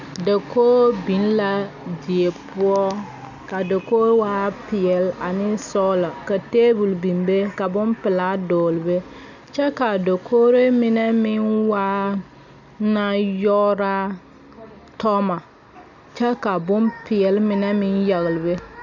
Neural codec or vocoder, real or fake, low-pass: none; real; 7.2 kHz